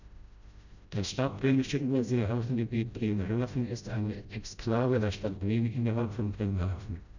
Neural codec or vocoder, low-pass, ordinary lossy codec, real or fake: codec, 16 kHz, 0.5 kbps, FreqCodec, smaller model; 7.2 kHz; none; fake